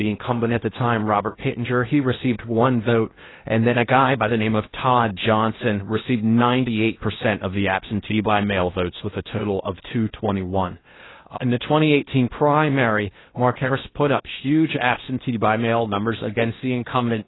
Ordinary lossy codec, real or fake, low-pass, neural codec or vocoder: AAC, 16 kbps; fake; 7.2 kHz; codec, 16 kHz in and 24 kHz out, 0.6 kbps, FocalCodec, streaming, 4096 codes